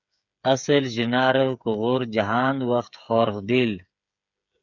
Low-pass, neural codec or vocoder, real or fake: 7.2 kHz; codec, 16 kHz, 8 kbps, FreqCodec, smaller model; fake